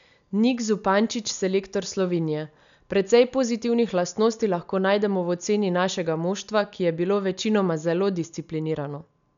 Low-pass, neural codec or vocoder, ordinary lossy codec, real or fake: 7.2 kHz; none; none; real